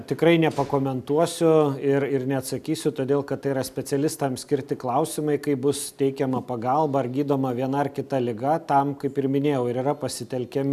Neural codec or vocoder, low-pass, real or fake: none; 14.4 kHz; real